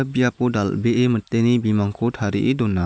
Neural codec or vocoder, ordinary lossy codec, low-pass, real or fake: none; none; none; real